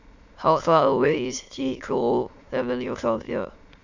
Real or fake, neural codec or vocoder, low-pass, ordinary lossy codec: fake; autoencoder, 22.05 kHz, a latent of 192 numbers a frame, VITS, trained on many speakers; 7.2 kHz; none